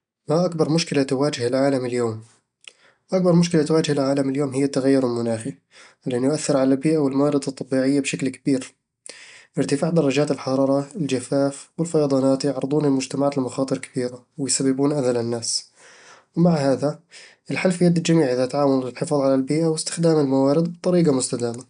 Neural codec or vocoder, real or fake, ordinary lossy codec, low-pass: none; real; none; 9.9 kHz